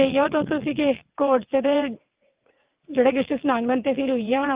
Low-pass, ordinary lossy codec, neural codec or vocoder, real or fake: 3.6 kHz; Opus, 32 kbps; vocoder, 22.05 kHz, 80 mel bands, WaveNeXt; fake